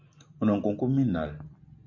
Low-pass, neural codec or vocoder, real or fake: 7.2 kHz; none; real